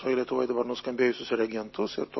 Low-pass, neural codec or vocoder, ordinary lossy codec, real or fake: 7.2 kHz; none; MP3, 24 kbps; real